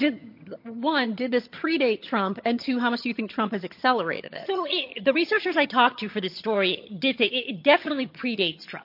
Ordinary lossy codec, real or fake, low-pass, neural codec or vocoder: MP3, 32 kbps; fake; 5.4 kHz; vocoder, 22.05 kHz, 80 mel bands, HiFi-GAN